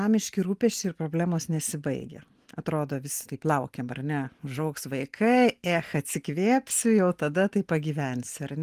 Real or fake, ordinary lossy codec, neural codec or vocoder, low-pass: real; Opus, 24 kbps; none; 14.4 kHz